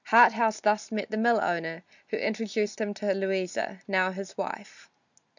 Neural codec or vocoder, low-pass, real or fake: none; 7.2 kHz; real